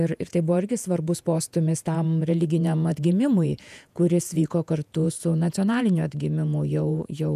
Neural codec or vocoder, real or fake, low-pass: vocoder, 48 kHz, 128 mel bands, Vocos; fake; 14.4 kHz